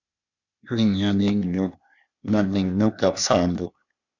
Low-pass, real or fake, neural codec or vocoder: 7.2 kHz; fake; codec, 16 kHz, 0.8 kbps, ZipCodec